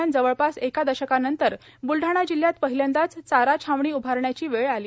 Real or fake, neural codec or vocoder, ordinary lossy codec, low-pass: real; none; none; none